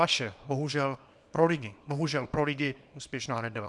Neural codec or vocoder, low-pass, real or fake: codec, 24 kHz, 0.9 kbps, WavTokenizer, small release; 10.8 kHz; fake